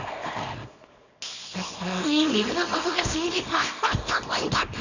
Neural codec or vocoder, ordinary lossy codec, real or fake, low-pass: codec, 24 kHz, 0.9 kbps, WavTokenizer, small release; none; fake; 7.2 kHz